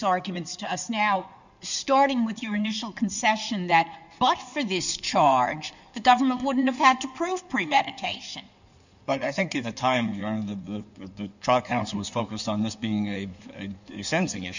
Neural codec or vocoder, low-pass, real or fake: codec, 16 kHz in and 24 kHz out, 2.2 kbps, FireRedTTS-2 codec; 7.2 kHz; fake